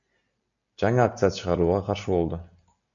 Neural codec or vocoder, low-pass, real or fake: none; 7.2 kHz; real